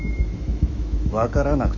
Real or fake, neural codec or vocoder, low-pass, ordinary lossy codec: fake; autoencoder, 48 kHz, 128 numbers a frame, DAC-VAE, trained on Japanese speech; 7.2 kHz; none